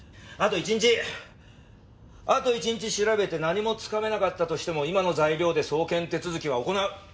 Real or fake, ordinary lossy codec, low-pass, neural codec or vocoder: real; none; none; none